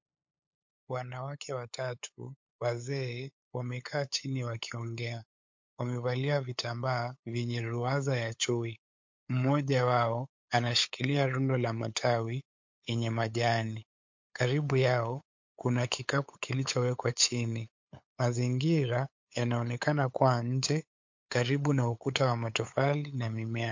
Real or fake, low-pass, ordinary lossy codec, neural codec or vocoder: fake; 7.2 kHz; MP3, 48 kbps; codec, 16 kHz, 8 kbps, FunCodec, trained on LibriTTS, 25 frames a second